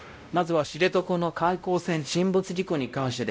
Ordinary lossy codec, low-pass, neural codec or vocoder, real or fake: none; none; codec, 16 kHz, 0.5 kbps, X-Codec, WavLM features, trained on Multilingual LibriSpeech; fake